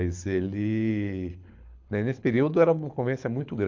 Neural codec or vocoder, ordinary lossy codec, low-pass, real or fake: codec, 24 kHz, 6 kbps, HILCodec; MP3, 64 kbps; 7.2 kHz; fake